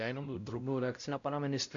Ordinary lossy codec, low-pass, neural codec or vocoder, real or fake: MP3, 64 kbps; 7.2 kHz; codec, 16 kHz, 0.5 kbps, X-Codec, WavLM features, trained on Multilingual LibriSpeech; fake